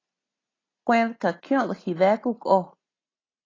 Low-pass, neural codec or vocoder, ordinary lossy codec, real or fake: 7.2 kHz; none; AAC, 32 kbps; real